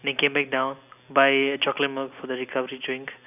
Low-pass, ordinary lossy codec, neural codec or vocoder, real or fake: 3.6 kHz; none; none; real